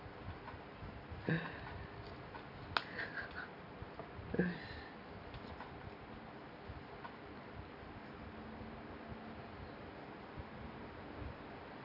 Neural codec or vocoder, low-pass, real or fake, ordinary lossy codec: none; 5.4 kHz; real; none